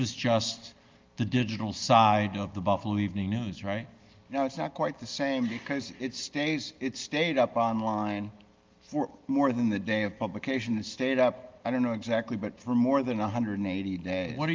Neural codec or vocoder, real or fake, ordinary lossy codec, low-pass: none; real; Opus, 32 kbps; 7.2 kHz